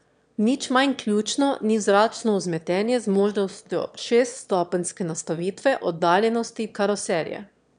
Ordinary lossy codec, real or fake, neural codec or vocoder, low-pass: none; fake; autoencoder, 22.05 kHz, a latent of 192 numbers a frame, VITS, trained on one speaker; 9.9 kHz